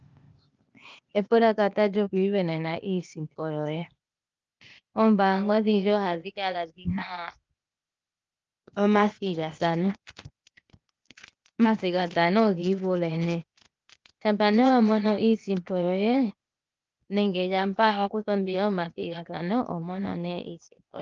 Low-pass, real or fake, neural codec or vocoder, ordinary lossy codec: 7.2 kHz; fake; codec, 16 kHz, 0.8 kbps, ZipCodec; Opus, 24 kbps